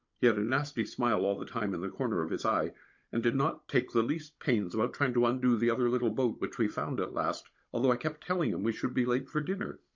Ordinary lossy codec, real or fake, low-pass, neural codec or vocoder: MP3, 48 kbps; fake; 7.2 kHz; codec, 16 kHz, 16 kbps, FunCodec, trained on Chinese and English, 50 frames a second